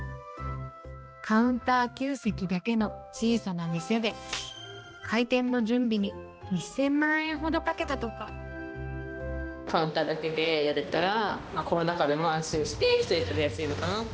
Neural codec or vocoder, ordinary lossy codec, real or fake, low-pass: codec, 16 kHz, 1 kbps, X-Codec, HuBERT features, trained on balanced general audio; none; fake; none